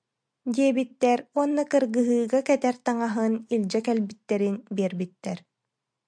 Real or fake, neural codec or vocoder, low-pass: real; none; 9.9 kHz